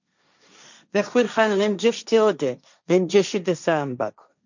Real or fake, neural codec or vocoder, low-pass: fake; codec, 16 kHz, 1.1 kbps, Voila-Tokenizer; 7.2 kHz